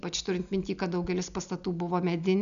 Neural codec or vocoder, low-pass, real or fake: none; 7.2 kHz; real